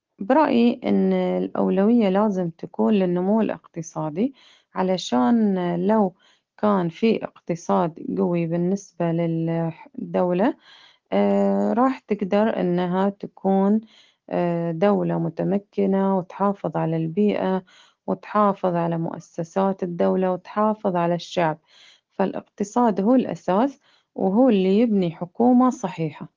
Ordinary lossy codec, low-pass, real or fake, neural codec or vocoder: Opus, 16 kbps; 7.2 kHz; real; none